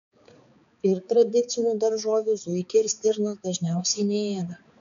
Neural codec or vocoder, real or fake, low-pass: codec, 16 kHz, 4 kbps, X-Codec, HuBERT features, trained on balanced general audio; fake; 7.2 kHz